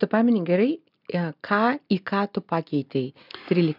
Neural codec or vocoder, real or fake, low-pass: none; real; 5.4 kHz